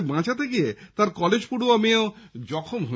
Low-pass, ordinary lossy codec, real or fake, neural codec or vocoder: none; none; real; none